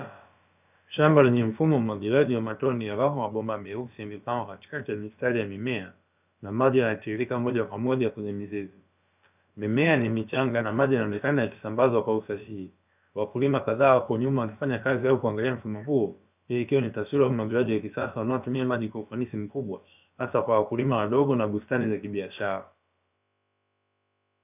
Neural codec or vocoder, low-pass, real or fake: codec, 16 kHz, about 1 kbps, DyCAST, with the encoder's durations; 3.6 kHz; fake